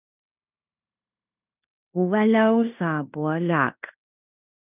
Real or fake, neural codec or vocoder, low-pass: fake; codec, 16 kHz in and 24 kHz out, 0.9 kbps, LongCat-Audio-Codec, fine tuned four codebook decoder; 3.6 kHz